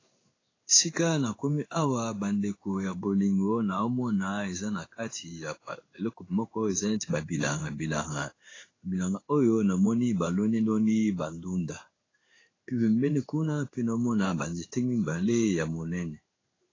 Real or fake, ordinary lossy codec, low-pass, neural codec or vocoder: fake; AAC, 32 kbps; 7.2 kHz; codec, 16 kHz in and 24 kHz out, 1 kbps, XY-Tokenizer